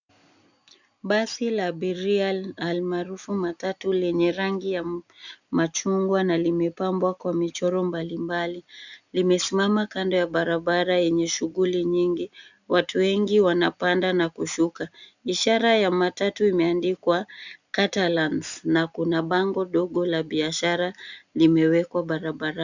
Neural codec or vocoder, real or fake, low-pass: none; real; 7.2 kHz